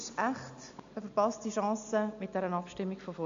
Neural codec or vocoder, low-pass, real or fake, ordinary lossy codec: none; 7.2 kHz; real; MP3, 64 kbps